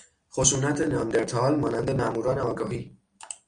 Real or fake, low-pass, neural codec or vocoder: real; 9.9 kHz; none